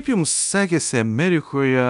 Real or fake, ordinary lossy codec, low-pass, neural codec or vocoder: fake; MP3, 96 kbps; 10.8 kHz; codec, 24 kHz, 0.9 kbps, DualCodec